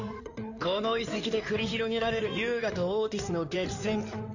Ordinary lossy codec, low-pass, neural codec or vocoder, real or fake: none; 7.2 kHz; codec, 16 kHz in and 24 kHz out, 2.2 kbps, FireRedTTS-2 codec; fake